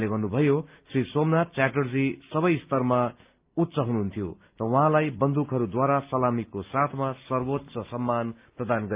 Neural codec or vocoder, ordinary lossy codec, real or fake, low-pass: none; Opus, 24 kbps; real; 3.6 kHz